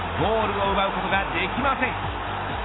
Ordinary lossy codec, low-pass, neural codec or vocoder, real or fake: AAC, 16 kbps; 7.2 kHz; none; real